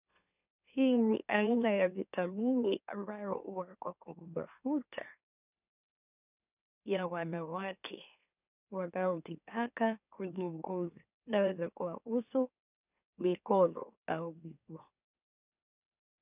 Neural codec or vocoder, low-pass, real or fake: autoencoder, 44.1 kHz, a latent of 192 numbers a frame, MeloTTS; 3.6 kHz; fake